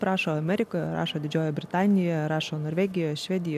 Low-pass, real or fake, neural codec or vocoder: 14.4 kHz; real; none